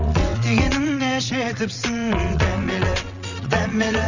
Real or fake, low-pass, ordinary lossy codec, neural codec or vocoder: fake; 7.2 kHz; none; vocoder, 22.05 kHz, 80 mel bands, WaveNeXt